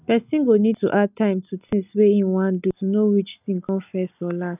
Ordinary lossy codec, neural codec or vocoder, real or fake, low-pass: none; none; real; 3.6 kHz